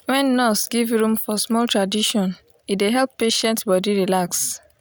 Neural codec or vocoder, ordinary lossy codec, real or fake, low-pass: none; none; real; none